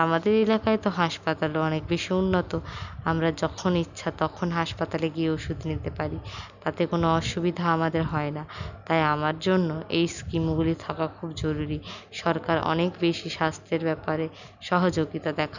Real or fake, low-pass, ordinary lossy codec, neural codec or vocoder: real; 7.2 kHz; none; none